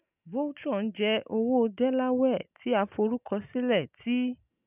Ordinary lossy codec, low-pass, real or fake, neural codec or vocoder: none; 3.6 kHz; real; none